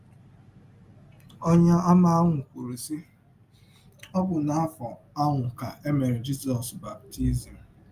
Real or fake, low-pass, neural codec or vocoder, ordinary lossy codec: real; 14.4 kHz; none; Opus, 32 kbps